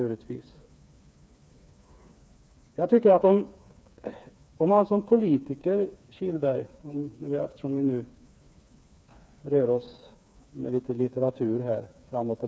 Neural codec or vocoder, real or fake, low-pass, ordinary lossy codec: codec, 16 kHz, 4 kbps, FreqCodec, smaller model; fake; none; none